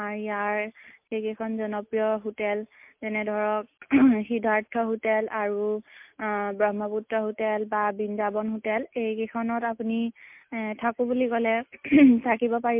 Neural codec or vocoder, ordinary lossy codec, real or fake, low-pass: none; MP3, 32 kbps; real; 3.6 kHz